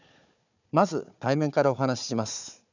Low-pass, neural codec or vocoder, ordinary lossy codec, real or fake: 7.2 kHz; codec, 16 kHz, 4 kbps, FunCodec, trained on Chinese and English, 50 frames a second; none; fake